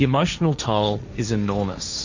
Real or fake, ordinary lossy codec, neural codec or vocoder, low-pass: fake; Opus, 64 kbps; codec, 16 kHz, 1.1 kbps, Voila-Tokenizer; 7.2 kHz